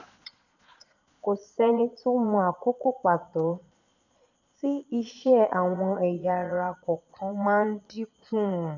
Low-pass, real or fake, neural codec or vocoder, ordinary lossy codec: 7.2 kHz; fake; vocoder, 22.05 kHz, 80 mel bands, Vocos; none